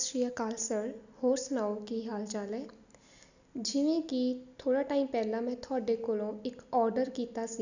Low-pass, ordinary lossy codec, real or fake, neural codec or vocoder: 7.2 kHz; none; real; none